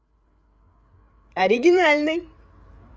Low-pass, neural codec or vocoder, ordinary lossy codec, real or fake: none; codec, 16 kHz, 8 kbps, FreqCodec, larger model; none; fake